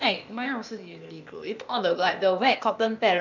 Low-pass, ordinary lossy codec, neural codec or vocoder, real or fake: 7.2 kHz; none; codec, 16 kHz, 0.8 kbps, ZipCodec; fake